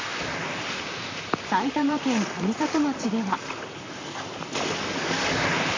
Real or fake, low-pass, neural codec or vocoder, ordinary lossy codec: fake; 7.2 kHz; vocoder, 44.1 kHz, 128 mel bands, Pupu-Vocoder; AAC, 32 kbps